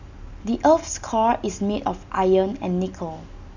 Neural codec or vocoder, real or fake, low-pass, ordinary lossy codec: none; real; 7.2 kHz; none